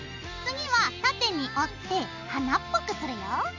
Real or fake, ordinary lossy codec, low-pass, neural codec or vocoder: real; none; 7.2 kHz; none